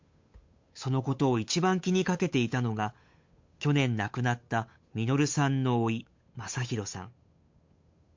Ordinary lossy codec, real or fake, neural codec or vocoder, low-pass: MP3, 48 kbps; fake; codec, 16 kHz, 8 kbps, FunCodec, trained on Chinese and English, 25 frames a second; 7.2 kHz